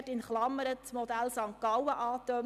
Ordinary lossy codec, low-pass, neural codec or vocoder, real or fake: none; 14.4 kHz; none; real